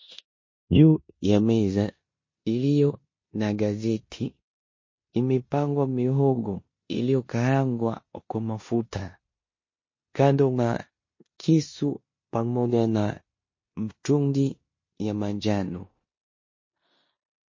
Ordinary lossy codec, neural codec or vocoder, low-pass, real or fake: MP3, 32 kbps; codec, 16 kHz in and 24 kHz out, 0.9 kbps, LongCat-Audio-Codec, four codebook decoder; 7.2 kHz; fake